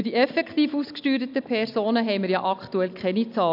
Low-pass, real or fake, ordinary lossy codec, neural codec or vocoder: 5.4 kHz; real; AAC, 48 kbps; none